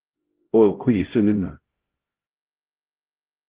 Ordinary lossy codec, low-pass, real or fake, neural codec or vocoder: Opus, 16 kbps; 3.6 kHz; fake; codec, 16 kHz, 0.5 kbps, X-Codec, HuBERT features, trained on LibriSpeech